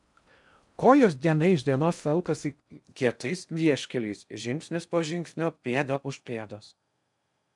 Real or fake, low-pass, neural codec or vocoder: fake; 10.8 kHz; codec, 16 kHz in and 24 kHz out, 0.6 kbps, FocalCodec, streaming, 4096 codes